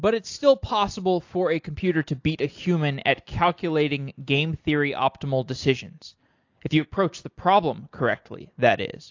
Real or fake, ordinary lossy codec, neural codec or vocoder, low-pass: real; AAC, 48 kbps; none; 7.2 kHz